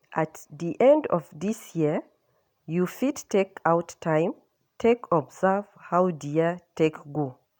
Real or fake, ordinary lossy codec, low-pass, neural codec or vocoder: real; none; 19.8 kHz; none